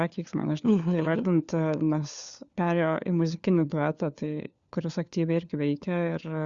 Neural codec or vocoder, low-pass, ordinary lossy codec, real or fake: codec, 16 kHz, 4 kbps, FunCodec, trained on LibriTTS, 50 frames a second; 7.2 kHz; Opus, 64 kbps; fake